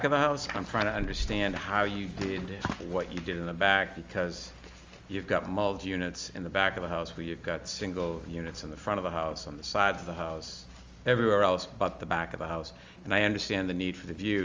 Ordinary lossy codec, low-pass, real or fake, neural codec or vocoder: Opus, 32 kbps; 7.2 kHz; real; none